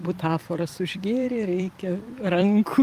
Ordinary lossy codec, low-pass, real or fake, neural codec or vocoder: Opus, 32 kbps; 14.4 kHz; fake; vocoder, 44.1 kHz, 128 mel bands every 256 samples, BigVGAN v2